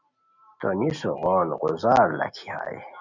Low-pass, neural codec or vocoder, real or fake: 7.2 kHz; none; real